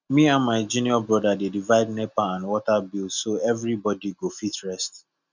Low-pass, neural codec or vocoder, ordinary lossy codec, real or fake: 7.2 kHz; none; none; real